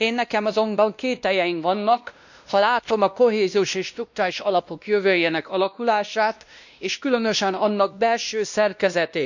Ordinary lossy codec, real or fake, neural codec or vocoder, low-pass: none; fake; codec, 16 kHz, 1 kbps, X-Codec, WavLM features, trained on Multilingual LibriSpeech; 7.2 kHz